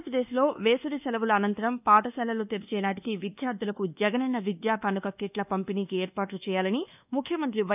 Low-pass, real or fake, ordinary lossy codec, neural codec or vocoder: 3.6 kHz; fake; none; codec, 24 kHz, 1.2 kbps, DualCodec